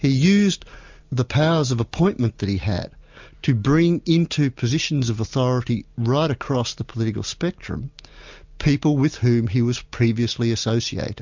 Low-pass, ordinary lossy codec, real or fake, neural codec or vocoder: 7.2 kHz; MP3, 64 kbps; real; none